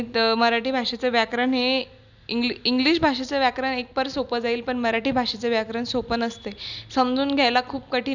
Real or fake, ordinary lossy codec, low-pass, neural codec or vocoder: real; none; 7.2 kHz; none